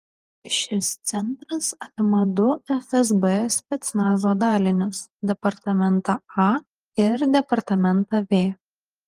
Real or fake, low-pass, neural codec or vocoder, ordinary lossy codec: fake; 14.4 kHz; vocoder, 48 kHz, 128 mel bands, Vocos; Opus, 24 kbps